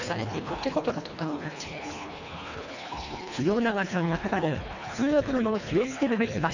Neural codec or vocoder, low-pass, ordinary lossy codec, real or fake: codec, 24 kHz, 1.5 kbps, HILCodec; 7.2 kHz; none; fake